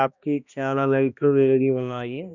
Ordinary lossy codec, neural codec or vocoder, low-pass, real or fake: none; codec, 16 kHz, 1 kbps, X-Codec, HuBERT features, trained on balanced general audio; 7.2 kHz; fake